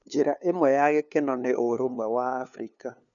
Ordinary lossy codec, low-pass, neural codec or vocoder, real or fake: none; 7.2 kHz; codec, 16 kHz, 2 kbps, FunCodec, trained on LibriTTS, 25 frames a second; fake